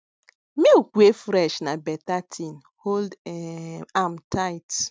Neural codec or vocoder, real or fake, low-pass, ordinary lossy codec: none; real; none; none